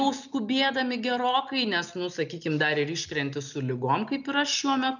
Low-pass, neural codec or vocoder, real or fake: 7.2 kHz; none; real